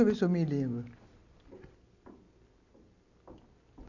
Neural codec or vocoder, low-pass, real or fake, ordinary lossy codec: none; 7.2 kHz; real; none